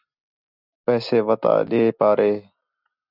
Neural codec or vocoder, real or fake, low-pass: none; real; 5.4 kHz